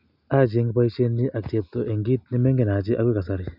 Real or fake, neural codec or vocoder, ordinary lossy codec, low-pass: real; none; none; 5.4 kHz